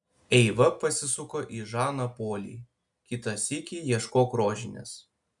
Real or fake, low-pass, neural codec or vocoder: real; 10.8 kHz; none